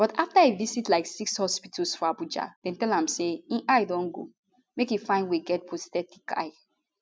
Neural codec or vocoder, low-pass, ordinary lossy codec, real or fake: none; none; none; real